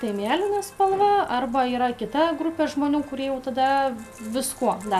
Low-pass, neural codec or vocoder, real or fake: 14.4 kHz; none; real